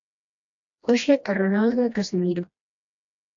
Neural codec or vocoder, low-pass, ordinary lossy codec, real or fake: codec, 16 kHz, 1 kbps, FreqCodec, smaller model; 7.2 kHz; AAC, 64 kbps; fake